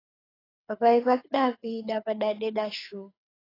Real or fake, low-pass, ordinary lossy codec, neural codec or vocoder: fake; 5.4 kHz; AAC, 24 kbps; codec, 24 kHz, 6 kbps, HILCodec